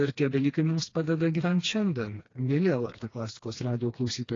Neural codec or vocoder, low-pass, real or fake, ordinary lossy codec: codec, 16 kHz, 2 kbps, FreqCodec, smaller model; 7.2 kHz; fake; AAC, 32 kbps